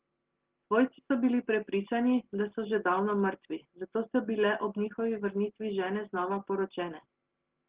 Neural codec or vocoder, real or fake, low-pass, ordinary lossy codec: none; real; 3.6 kHz; Opus, 16 kbps